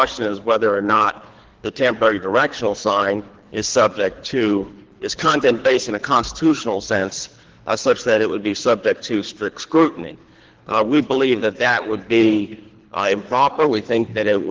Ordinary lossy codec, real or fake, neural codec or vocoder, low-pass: Opus, 16 kbps; fake; codec, 24 kHz, 3 kbps, HILCodec; 7.2 kHz